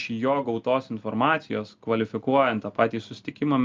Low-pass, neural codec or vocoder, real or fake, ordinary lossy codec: 7.2 kHz; none; real; Opus, 24 kbps